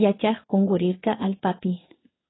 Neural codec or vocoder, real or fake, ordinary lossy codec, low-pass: codec, 16 kHz in and 24 kHz out, 1 kbps, XY-Tokenizer; fake; AAC, 16 kbps; 7.2 kHz